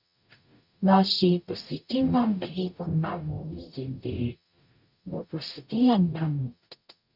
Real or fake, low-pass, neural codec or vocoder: fake; 5.4 kHz; codec, 44.1 kHz, 0.9 kbps, DAC